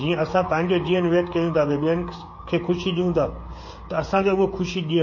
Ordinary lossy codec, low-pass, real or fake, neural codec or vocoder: MP3, 32 kbps; 7.2 kHz; fake; codec, 16 kHz, 16 kbps, FreqCodec, smaller model